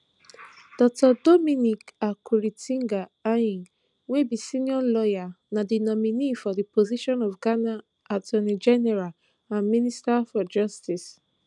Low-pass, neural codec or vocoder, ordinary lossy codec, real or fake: 10.8 kHz; none; none; real